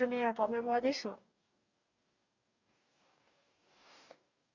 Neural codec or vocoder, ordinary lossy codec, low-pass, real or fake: codec, 44.1 kHz, 2.6 kbps, DAC; Opus, 64 kbps; 7.2 kHz; fake